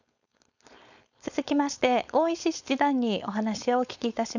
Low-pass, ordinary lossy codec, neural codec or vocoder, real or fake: 7.2 kHz; none; codec, 16 kHz, 4.8 kbps, FACodec; fake